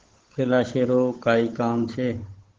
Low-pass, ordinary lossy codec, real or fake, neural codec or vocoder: 7.2 kHz; Opus, 16 kbps; fake; codec, 16 kHz, 8 kbps, FunCodec, trained on Chinese and English, 25 frames a second